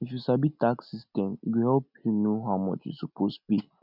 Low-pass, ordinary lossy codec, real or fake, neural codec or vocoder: 5.4 kHz; none; real; none